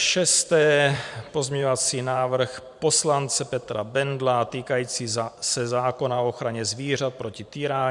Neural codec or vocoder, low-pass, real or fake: vocoder, 44.1 kHz, 128 mel bands every 512 samples, BigVGAN v2; 10.8 kHz; fake